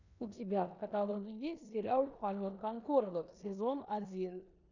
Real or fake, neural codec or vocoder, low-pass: fake; codec, 16 kHz in and 24 kHz out, 0.9 kbps, LongCat-Audio-Codec, four codebook decoder; 7.2 kHz